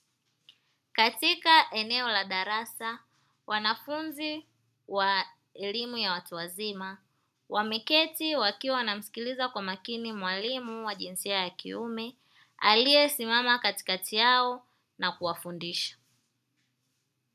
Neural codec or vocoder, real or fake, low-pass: none; real; 14.4 kHz